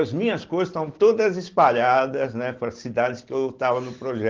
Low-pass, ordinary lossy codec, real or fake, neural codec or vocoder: 7.2 kHz; Opus, 16 kbps; real; none